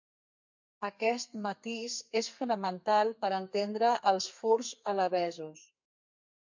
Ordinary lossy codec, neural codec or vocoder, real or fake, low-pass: MP3, 48 kbps; codec, 32 kHz, 1.9 kbps, SNAC; fake; 7.2 kHz